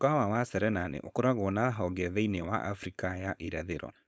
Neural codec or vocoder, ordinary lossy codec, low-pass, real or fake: codec, 16 kHz, 4.8 kbps, FACodec; none; none; fake